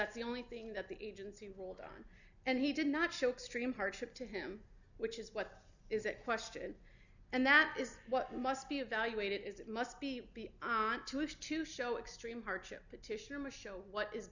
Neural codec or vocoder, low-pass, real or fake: none; 7.2 kHz; real